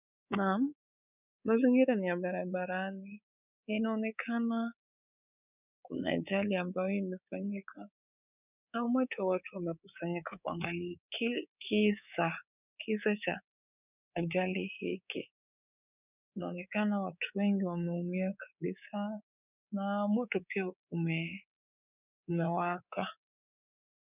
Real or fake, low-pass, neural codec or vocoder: fake; 3.6 kHz; codec, 24 kHz, 3.1 kbps, DualCodec